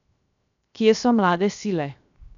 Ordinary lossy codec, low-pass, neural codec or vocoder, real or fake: none; 7.2 kHz; codec, 16 kHz, 0.7 kbps, FocalCodec; fake